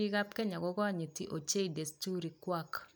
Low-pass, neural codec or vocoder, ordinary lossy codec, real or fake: none; none; none; real